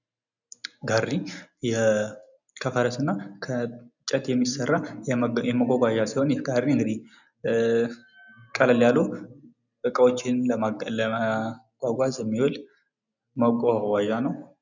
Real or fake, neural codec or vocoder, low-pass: real; none; 7.2 kHz